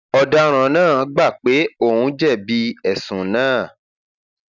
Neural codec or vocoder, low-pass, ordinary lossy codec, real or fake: none; 7.2 kHz; none; real